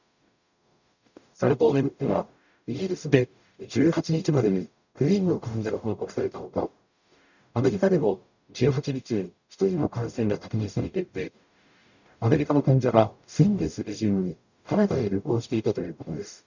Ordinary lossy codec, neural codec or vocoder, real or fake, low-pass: none; codec, 44.1 kHz, 0.9 kbps, DAC; fake; 7.2 kHz